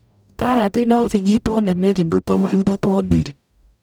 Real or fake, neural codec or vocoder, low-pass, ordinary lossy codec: fake; codec, 44.1 kHz, 0.9 kbps, DAC; none; none